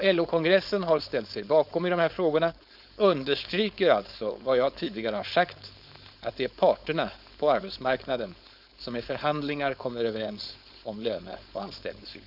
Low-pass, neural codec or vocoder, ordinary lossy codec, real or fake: 5.4 kHz; codec, 16 kHz, 4.8 kbps, FACodec; none; fake